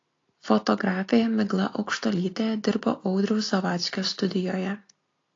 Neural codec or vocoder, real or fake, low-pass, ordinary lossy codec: none; real; 7.2 kHz; AAC, 32 kbps